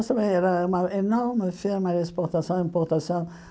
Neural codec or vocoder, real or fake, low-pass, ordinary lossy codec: none; real; none; none